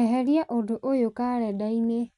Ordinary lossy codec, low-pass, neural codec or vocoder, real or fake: none; 10.8 kHz; none; real